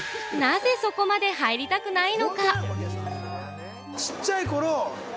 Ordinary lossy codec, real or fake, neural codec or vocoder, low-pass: none; real; none; none